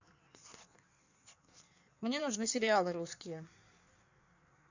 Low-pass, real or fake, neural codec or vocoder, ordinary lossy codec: 7.2 kHz; fake; codec, 16 kHz in and 24 kHz out, 1.1 kbps, FireRedTTS-2 codec; none